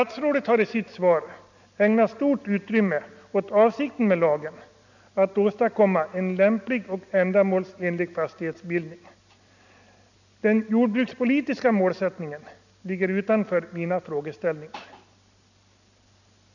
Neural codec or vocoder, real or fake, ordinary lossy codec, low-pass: none; real; none; 7.2 kHz